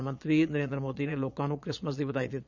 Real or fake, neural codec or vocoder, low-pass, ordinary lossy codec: fake; vocoder, 22.05 kHz, 80 mel bands, Vocos; 7.2 kHz; none